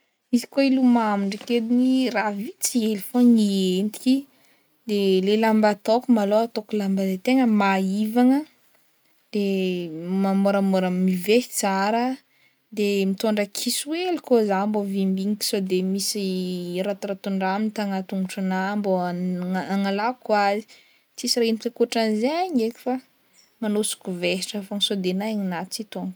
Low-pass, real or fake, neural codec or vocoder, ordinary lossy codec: none; real; none; none